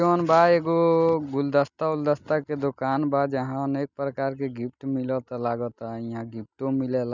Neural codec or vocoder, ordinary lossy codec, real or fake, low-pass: none; none; real; 7.2 kHz